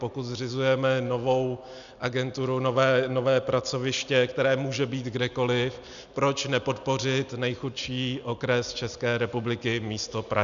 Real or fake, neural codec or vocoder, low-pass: real; none; 7.2 kHz